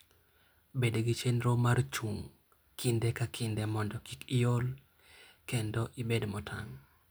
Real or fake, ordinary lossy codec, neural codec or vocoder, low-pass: real; none; none; none